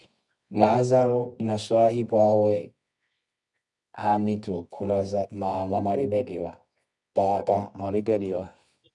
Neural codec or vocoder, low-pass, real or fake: codec, 24 kHz, 0.9 kbps, WavTokenizer, medium music audio release; 10.8 kHz; fake